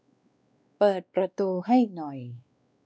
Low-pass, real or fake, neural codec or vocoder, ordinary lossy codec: none; fake; codec, 16 kHz, 2 kbps, X-Codec, WavLM features, trained on Multilingual LibriSpeech; none